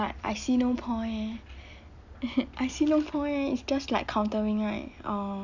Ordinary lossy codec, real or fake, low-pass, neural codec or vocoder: none; real; 7.2 kHz; none